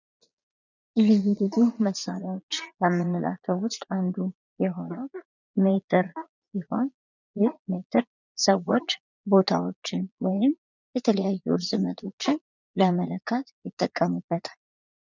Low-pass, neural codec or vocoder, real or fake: 7.2 kHz; vocoder, 22.05 kHz, 80 mel bands, Vocos; fake